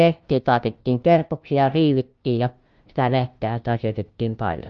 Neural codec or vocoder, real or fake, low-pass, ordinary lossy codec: codec, 16 kHz, 0.5 kbps, FunCodec, trained on LibriTTS, 25 frames a second; fake; 7.2 kHz; Opus, 24 kbps